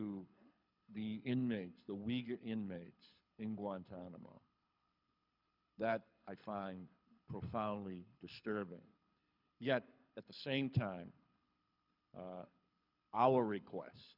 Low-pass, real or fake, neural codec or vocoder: 5.4 kHz; fake; codec, 24 kHz, 6 kbps, HILCodec